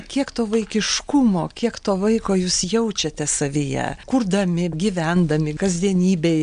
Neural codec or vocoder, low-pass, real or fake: none; 9.9 kHz; real